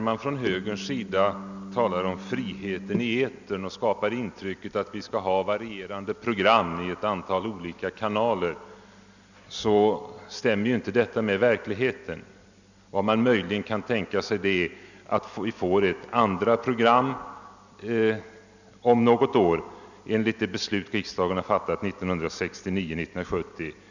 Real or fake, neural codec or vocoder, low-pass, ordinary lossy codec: real; none; 7.2 kHz; none